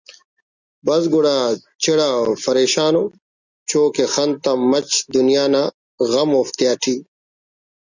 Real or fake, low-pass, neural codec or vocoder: real; 7.2 kHz; none